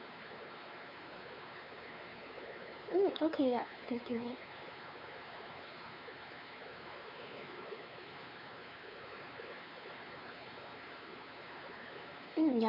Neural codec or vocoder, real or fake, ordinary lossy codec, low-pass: codec, 16 kHz, 4 kbps, X-Codec, WavLM features, trained on Multilingual LibriSpeech; fake; none; 5.4 kHz